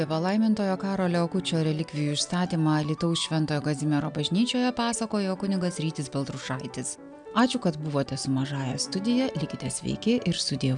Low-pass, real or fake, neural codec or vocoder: 9.9 kHz; real; none